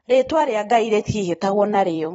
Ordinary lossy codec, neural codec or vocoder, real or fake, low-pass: AAC, 24 kbps; vocoder, 44.1 kHz, 128 mel bands every 256 samples, BigVGAN v2; fake; 19.8 kHz